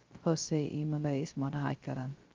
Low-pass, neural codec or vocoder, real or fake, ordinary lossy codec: 7.2 kHz; codec, 16 kHz, 0.3 kbps, FocalCodec; fake; Opus, 24 kbps